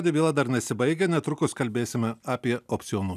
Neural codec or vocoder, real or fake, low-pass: none; real; 14.4 kHz